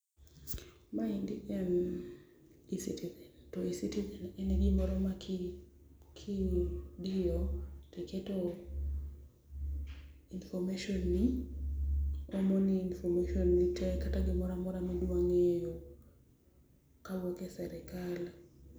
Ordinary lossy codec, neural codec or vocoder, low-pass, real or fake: none; none; none; real